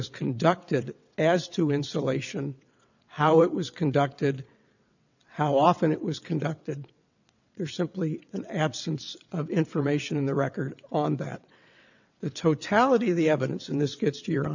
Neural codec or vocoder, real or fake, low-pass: vocoder, 22.05 kHz, 80 mel bands, WaveNeXt; fake; 7.2 kHz